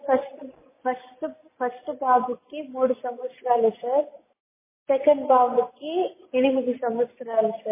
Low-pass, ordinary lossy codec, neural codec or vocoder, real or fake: 3.6 kHz; MP3, 16 kbps; none; real